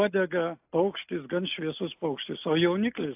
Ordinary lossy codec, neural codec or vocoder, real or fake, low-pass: AAC, 32 kbps; none; real; 3.6 kHz